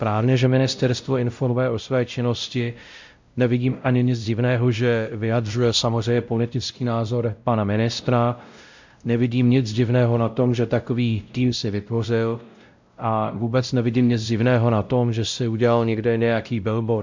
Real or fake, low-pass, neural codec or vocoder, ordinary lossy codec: fake; 7.2 kHz; codec, 16 kHz, 0.5 kbps, X-Codec, WavLM features, trained on Multilingual LibriSpeech; MP3, 64 kbps